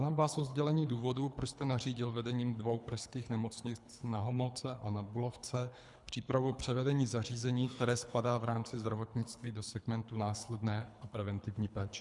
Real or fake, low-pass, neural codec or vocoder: fake; 10.8 kHz; codec, 24 kHz, 3 kbps, HILCodec